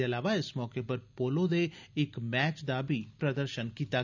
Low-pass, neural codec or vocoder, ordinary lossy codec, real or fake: 7.2 kHz; none; none; real